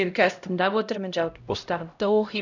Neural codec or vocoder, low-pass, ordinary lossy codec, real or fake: codec, 16 kHz, 0.5 kbps, X-Codec, HuBERT features, trained on LibriSpeech; 7.2 kHz; none; fake